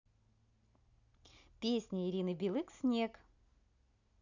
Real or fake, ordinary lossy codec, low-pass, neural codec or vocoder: real; none; 7.2 kHz; none